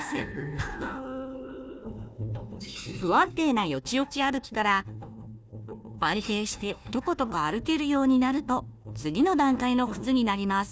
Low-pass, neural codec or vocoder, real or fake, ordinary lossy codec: none; codec, 16 kHz, 1 kbps, FunCodec, trained on Chinese and English, 50 frames a second; fake; none